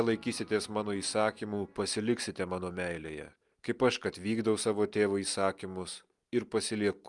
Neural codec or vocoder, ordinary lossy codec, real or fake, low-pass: none; Opus, 32 kbps; real; 10.8 kHz